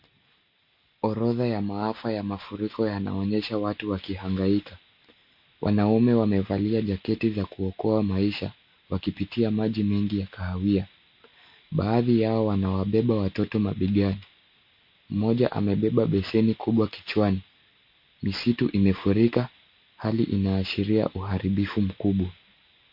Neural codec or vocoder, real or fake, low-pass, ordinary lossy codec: none; real; 5.4 kHz; MP3, 32 kbps